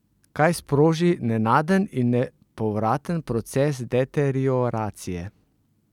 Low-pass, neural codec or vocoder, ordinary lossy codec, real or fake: 19.8 kHz; none; none; real